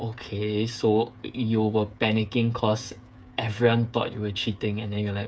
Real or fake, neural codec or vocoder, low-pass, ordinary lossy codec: fake; codec, 16 kHz, 8 kbps, FreqCodec, smaller model; none; none